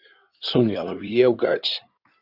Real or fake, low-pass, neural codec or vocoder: fake; 5.4 kHz; codec, 16 kHz in and 24 kHz out, 2.2 kbps, FireRedTTS-2 codec